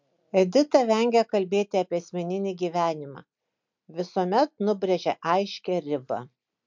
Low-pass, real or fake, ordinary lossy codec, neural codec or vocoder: 7.2 kHz; real; MP3, 64 kbps; none